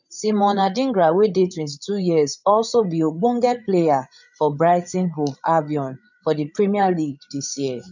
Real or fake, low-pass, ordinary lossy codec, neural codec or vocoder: fake; 7.2 kHz; none; codec, 16 kHz, 8 kbps, FreqCodec, larger model